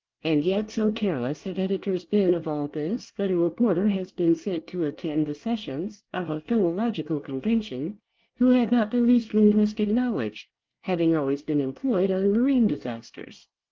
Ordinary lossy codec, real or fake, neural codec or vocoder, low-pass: Opus, 24 kbps; fake; codec, 24 kHz, 1 kbps, SNAC; 7.2 kHz